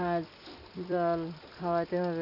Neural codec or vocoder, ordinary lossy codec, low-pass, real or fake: vocoder, 44.1 kHz, 128 mel bands every 256 samples, BigVGAN v2; none; 5.4 kHz; fake